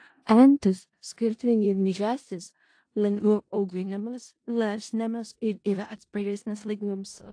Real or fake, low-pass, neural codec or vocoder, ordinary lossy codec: fake; 9.9 kHz; codec, 16 kHz in and 24 kHz out, 0.4 kbps, LongCat-Audio-Codec, four codebook decoder; AAC, 48 kbps